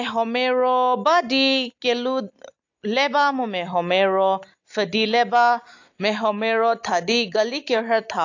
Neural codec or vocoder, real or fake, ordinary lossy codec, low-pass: none; real; AAC, 48 kbps; 7.2 kHz